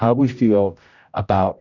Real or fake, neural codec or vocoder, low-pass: fake; codec, 16 kHz, 0.5 kbps, X-Codec, HuBERT features, trained on general audio; 7.2 kHz